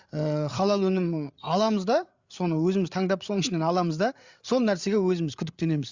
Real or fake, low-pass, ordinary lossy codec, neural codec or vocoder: fake; 7.2 kHz; Opus, 64 kbps; codec, 16 kHz, 8 kbps, FreqCodec, larger model